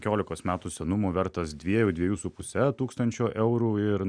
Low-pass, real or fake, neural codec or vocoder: 9.9 kHz; real; none